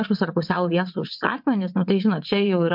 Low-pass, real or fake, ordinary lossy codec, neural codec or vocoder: 5.4 kHz; fake; MP3, 48 kbps; vocoder, 22.05 kHz, 80 mel bands, WaveNeXt